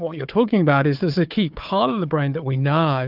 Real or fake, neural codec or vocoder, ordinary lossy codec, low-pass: fake; codec, 16 kHz, 4 kbps, FunCodec, trained on Chinese and English, 50 frames a second; Opus, 24 kbps; 5.4 kHz